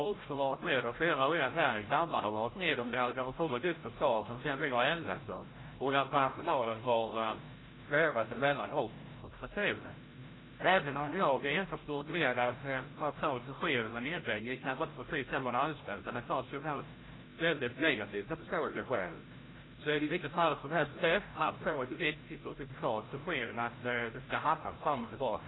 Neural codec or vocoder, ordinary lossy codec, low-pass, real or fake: codec, 16 kHz, 0.5 kbps, FreqCodec, larger model; AAC, 16 kbps; 7.2 kHz; fake